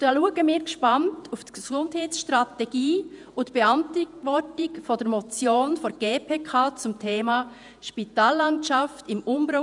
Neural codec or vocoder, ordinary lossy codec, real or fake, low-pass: vocoder, 48 kHz, 128 mel bands, Vocos; none; fake; 10.8 kHz